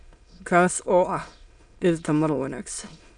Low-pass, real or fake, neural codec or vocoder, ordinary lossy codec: 9.9 kHz; fake; autoencoder, 22.05 kHz, a latent of 192 numbers a frame, VITS, trained on many speakers; none